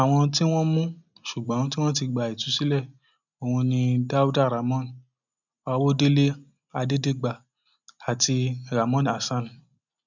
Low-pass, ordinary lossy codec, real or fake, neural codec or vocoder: 7.2 kHz; none; real; none